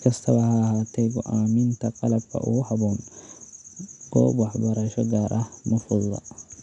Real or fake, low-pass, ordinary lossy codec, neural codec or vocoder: real; 10.8 kHz; none; none